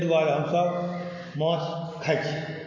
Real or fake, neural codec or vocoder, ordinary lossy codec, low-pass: real; none; MP3, 48 kbps; 7.2 kHz